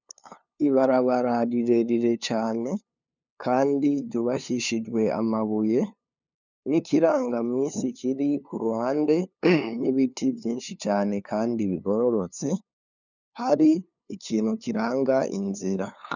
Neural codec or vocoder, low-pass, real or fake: codec, 16 kHz, 2 kbps, FunCodec, trained on LibriTTS, 25 frames a second; 7.2 kHz; fake